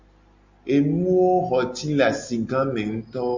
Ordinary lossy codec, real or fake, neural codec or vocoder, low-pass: MP3, 64 kbps; real; none; 7.2 kHz